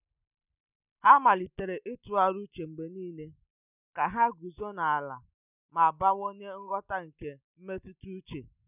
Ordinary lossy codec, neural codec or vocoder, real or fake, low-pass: none; none; real; 3.6 kHz